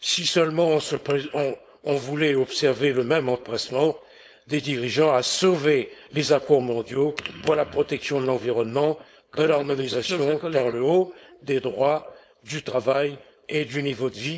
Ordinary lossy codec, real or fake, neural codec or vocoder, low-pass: none; fake; codec, 16 kHz, 4.8 kbps, FACodec; none